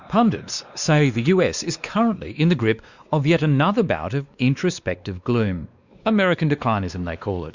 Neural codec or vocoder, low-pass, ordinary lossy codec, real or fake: codec, 16 kHz, 2 kbps, X-Codec, WavLM features, trained on Multilingual LibriSpeech; 7.2 kHz; Opus, 64 kbps; fake